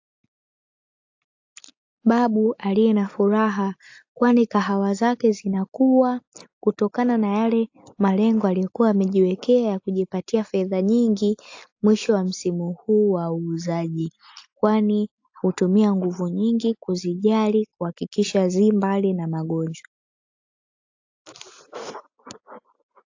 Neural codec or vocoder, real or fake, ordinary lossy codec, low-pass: none; real; AAC, 48 kbps; 7.2 kHz